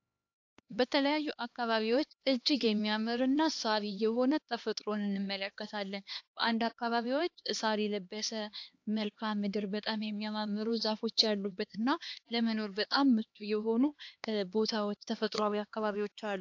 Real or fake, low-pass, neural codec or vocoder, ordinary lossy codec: fake; 7.2 kHz; codec, 16 kHz, 2 kbps, X-Codec, HuBERT features, trained on LibriSpeech; AAC, 48 kbps